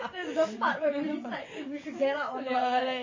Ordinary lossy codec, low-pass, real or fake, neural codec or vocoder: MP3, 32 kbps; 7.2 kHz; fake; vocoder, 22.05 kHz, 80 mel bands, WaveNeXt